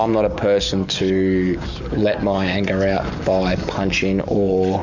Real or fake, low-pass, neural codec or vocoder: fake; 7.2 kHz; codec, 44.1 kHz, 7.8 kbps, DAC